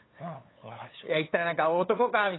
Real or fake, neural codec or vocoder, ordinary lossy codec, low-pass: fake; codec, 16 kHz, 8 kbps, FunCodec, trained on LibriTTS, 25 frames a second; AAC, 16 kbps; 7.2 kHz